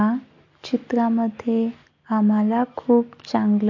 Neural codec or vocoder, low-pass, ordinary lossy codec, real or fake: none; 7.2 kHz; MP3, 48 kbps; real